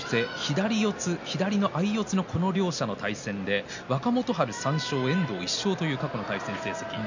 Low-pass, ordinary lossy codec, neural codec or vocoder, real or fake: 7.2 kHz; none; none; real